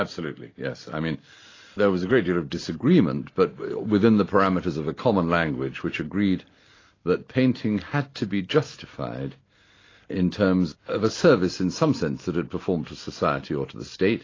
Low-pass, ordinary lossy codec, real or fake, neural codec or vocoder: 7.2 kHz; AAC, 32 kbps; real; none